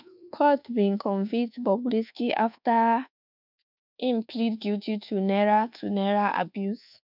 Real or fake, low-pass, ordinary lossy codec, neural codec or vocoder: fake; 5.4 kHz; none; codec, 24 kHz, 1.2 kbps, DualCodec